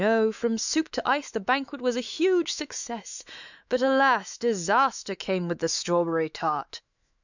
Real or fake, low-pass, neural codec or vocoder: fake; 7.2 kHz; autoencoder, 48 kHz, 128 numbers a frame, DAC-VAE, trained on Japanese speech